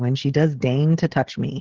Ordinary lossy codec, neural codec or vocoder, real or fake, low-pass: Opus, 16 kbps; codec, 16 kHz, 16 kbps, FreqCodec, smaller model; fake; 7.2 kHz